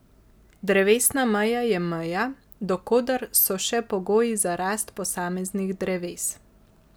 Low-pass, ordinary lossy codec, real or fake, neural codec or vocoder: none; none; real; none